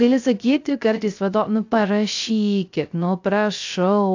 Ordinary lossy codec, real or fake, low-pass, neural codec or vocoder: AAC, 48 kbps; fake; 7.2 kHz; codec, 16 kHz, 0.3 kbps, FocalCodec